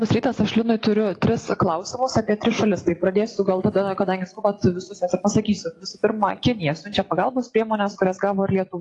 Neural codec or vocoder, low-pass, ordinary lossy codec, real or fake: none; 10.8 kHz; AAC, 48 kbps; real